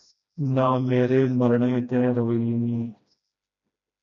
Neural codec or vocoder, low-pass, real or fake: codec, 16 kHz, 1 kbps, FreqCodec, smaller model; 7.2 kHz; fake